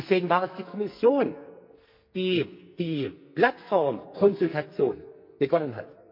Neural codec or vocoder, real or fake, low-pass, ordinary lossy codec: codec, 44.1 kHz, 2.6 kbps, SNAC; fake; 5.4 kHz; MP3, 32 kbps